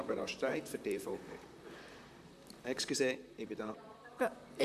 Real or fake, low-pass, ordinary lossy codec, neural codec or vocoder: fake; 14.4 kHz; none; vocoder, 44.1 kHz, 128 mel bands, Pupu-Vocoder